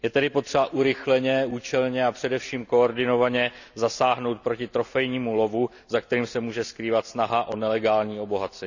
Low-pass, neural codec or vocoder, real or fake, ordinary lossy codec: 7.2 kHz; none; real; none